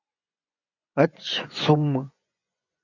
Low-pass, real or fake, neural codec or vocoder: 7.2 kHz; fake; vocoder, 24 kHz, 100 mel bands, Vocos